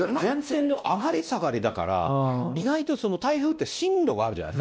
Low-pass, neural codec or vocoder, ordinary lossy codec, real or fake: none; codec, 16 kHz, 1 kbps, X-Codec, WavLM features, trained on Multilingual LibriSpeech; none; fake